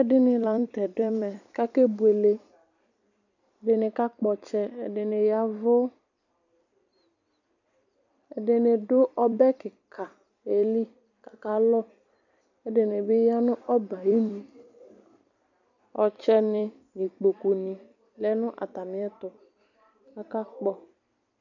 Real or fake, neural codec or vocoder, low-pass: fake; vocoder, 44.1 kHz, 128 mel bands every 256 samples, BigVGAN v2; 7.2 kHz